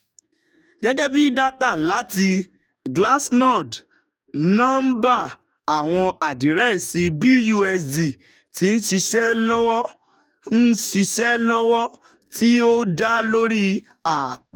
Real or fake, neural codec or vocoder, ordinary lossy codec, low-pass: fake; codec, 44.1 kHz, 2.6 kbps, DAC; none; 19.8 kHz